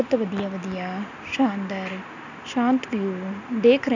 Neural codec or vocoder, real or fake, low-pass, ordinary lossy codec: none; real; 7.2 kHz; none